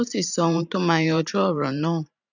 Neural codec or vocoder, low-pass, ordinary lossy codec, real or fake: vocoder, 44.1 kHz, 128 mel bands every 512 samples, BigVGAN v2; 7.2 kHz; none; fake